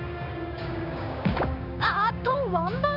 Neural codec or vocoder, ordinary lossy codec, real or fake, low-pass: codec, 16 kHz, 6 kbps, DAC; none; fake; 5.4 kHz